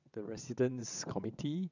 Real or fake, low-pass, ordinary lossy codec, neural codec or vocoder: fake; 7.2 kHz; none; vocoder, 22.05 kHz, 80 mel bands, WaveNeXt